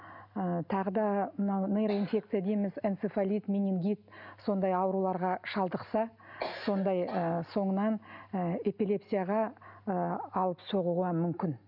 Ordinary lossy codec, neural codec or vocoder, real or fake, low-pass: none; none; real; 5.4 kHz